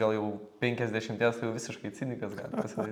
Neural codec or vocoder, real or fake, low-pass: none; real; 19.8 kHz